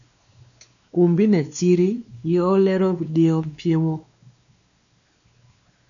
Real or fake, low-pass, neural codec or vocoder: fake; 7.2 kHz; codec, 16 kHz, 2 kbps, X-Codec, WavLM features, trained on Multilingual LibriSpeech